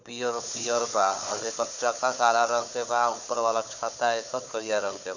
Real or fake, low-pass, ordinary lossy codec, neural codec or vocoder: fake; 7.2 kHz; none; codec, 16 kHz, 4 kbps, FunCodec, trained on Chinese and English, 50 frames a second